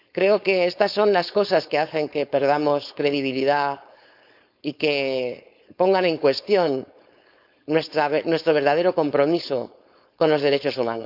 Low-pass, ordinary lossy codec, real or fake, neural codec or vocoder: 5.4 kHz; none; fake; codec, 16 kHz, 4.8 kbps, FACodec